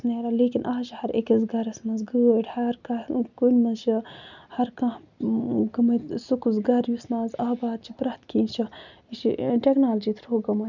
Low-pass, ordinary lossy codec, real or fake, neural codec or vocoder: 7.2 kHz; none; real; none